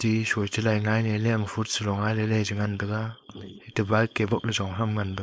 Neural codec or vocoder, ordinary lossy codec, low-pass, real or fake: codec, 16 kHz, 4.8 kbps, FACodec; none; none; fake